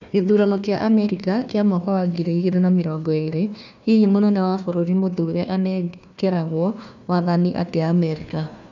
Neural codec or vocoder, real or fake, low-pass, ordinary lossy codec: codec, 16 kHz, 1 kbps, FunCodec, trained on Chinese and English, 50 frames a second; fake; 7.2 kHz; none